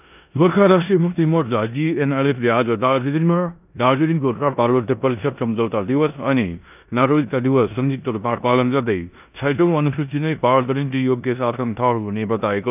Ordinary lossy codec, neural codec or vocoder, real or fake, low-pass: none; codec, 16 kHz in and 24 kHz out, 0.9 kbps, LongCat-Audio-Codec, four codebook decoder; fake; 3.6 kHz